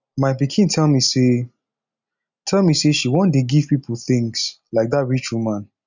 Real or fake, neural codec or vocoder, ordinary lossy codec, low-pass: real; none; none; 7.2 kHz